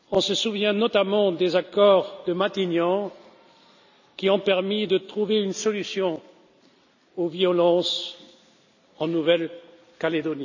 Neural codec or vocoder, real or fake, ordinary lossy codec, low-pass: none; real; none; 7.2 kHz